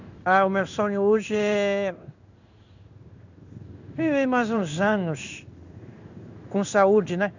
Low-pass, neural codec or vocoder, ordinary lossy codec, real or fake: 7.2 kHz; codec, 16 kHz in and 24 kHz out, 1 kbps, XY-Tokenizer; none; fake